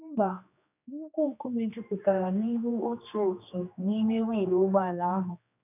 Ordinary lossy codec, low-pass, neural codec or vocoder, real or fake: none; 3.6 kHz; codec, 16 kHz, 2 kbps, X-Codec, HuBERT features, trained on general audio; fake